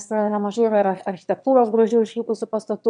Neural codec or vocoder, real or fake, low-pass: autoencoder, 22.05 kHz, a latent of 192 numbers a frame, VITS, trained on one speaker; fake; 9.9 kHz